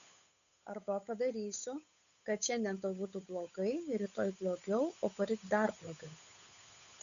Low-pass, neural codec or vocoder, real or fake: 7.2 kHz; codec, 16 kHz, 8 kbps, FunCodec, trained on Chinese and English, 25 frames a second; fake